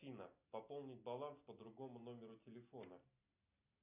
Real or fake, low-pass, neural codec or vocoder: real; 3.6 kHz; none